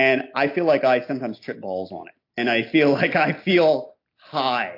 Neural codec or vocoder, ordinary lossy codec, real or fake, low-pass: none; AAC, 32 kbps; real; 5.4 kHz